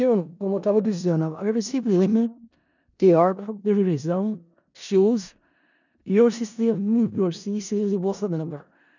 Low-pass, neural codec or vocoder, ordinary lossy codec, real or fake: 7.2 kHz; codec, 16 kHz in and 24 kHz out, 0.4 kbps, LongCat-Audio-Codec, four codebook decoder; none; fake